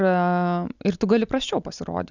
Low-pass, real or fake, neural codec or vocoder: 7.2 kHz; real; none